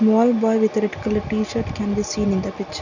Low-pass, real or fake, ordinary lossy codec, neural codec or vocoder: 7.2 kHz; real; none; none